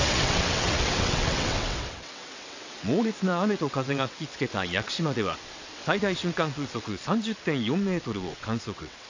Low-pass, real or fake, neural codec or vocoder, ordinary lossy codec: 7.2 kHz; fake; vocoder, 22.05 kHz, 80 mel bands, WaveNeXt; AAC, 48 kbps